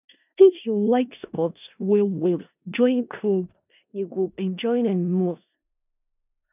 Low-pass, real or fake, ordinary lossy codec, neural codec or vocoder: 3.6 kHz; fake; none; codec, 16 kHz in and 24 kHz out, 0.4 kbps, LongCat-Audio-Codec, four codebook decoder